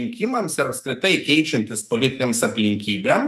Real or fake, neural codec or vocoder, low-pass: fake; codec, 44.1 kHz, 2.6 kbps, SNAC; 14.4 kHz